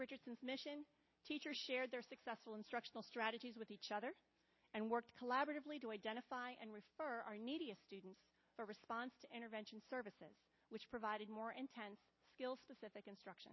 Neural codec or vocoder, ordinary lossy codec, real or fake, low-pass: none; MP3, 24 kbps; real; 7.2 kHz